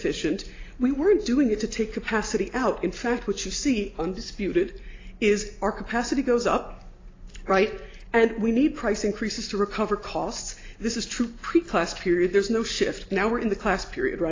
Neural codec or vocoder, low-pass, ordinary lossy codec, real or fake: none; 7.2 kHz; AAC, 32 kbps; real